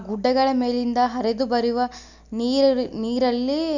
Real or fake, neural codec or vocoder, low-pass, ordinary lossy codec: real; none; 7.2 kHz; none